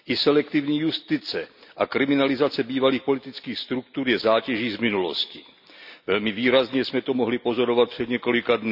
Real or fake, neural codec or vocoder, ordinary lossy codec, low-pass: real; none; none; 5.4 kHz